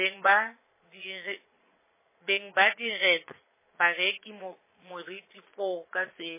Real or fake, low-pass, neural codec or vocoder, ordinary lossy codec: fake; 3.6 kHz; vocoder, 22.05 kHz, 80 mel bands, Vocos; MP3, 16 kbps